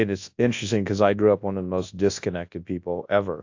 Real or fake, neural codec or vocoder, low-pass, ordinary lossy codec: fake; codec, 24 kHz, 0.9 kbps, WavTokenizer, large speech release; 7.2 kHz; AAC, 48 kbps